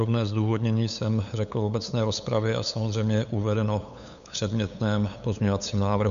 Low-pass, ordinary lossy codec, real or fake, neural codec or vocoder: 7.2 kHz; MP3, 96 kbps; fake; codec, 16 kHz, 8 kbps, FunCodec, trained on LibriTTS, 25 frames a second